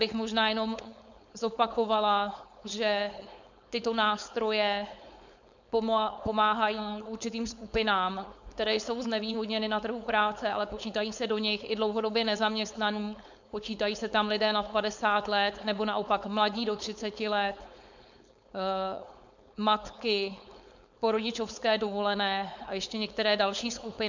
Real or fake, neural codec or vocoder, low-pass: fake; codec, 16 kHz, 4.8 kbps, FACodec; 7.2 kHz